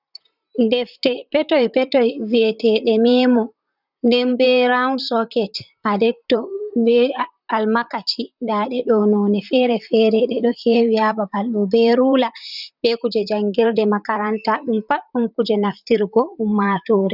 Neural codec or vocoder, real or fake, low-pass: vocoder, 44.1 kHz, 128 mel bands, Pupu-Vocoder; fake; 5.4 kHz